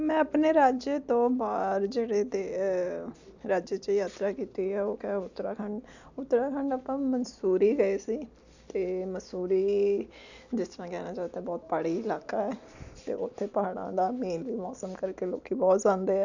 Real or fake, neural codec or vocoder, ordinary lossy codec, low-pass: real; none; none; 7.2 kHz